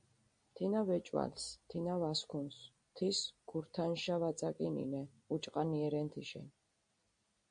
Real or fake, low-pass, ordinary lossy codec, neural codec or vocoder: real; 9.9 kHz; MP3, 48 kbps; none